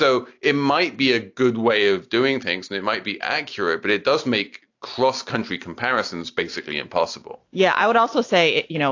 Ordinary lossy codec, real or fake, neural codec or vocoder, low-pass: AAC, 48 kbps; real; none; 7.2 kHz